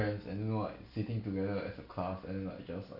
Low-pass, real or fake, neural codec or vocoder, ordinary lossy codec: 5.4 kHz; real; none; none